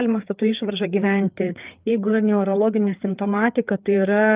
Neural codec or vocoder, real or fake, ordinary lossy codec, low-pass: codec, 16 kHz, 4 kbps, FreqCodec, larger model; fake; Opus, 32 kbps; 3.6 kHz